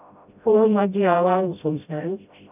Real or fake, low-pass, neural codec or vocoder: fake; 3.6 kHz; codec, 16 kHz, 0.5 kbps, FreqCodec, smaller model